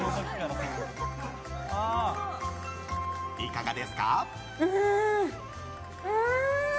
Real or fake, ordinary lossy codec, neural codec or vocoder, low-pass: real; none; none; none